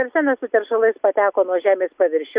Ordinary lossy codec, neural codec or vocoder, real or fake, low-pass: Opus, 64 kbps; none; real; 3.6 kHz